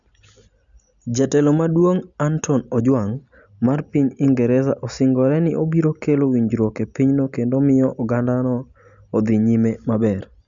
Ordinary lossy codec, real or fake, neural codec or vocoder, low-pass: none; real; none; 7.2 kHz